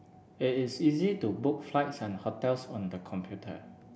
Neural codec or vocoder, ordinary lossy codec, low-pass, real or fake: none; none; none; real